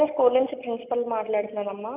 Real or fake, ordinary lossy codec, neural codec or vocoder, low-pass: real; none; none; 3.6 kHz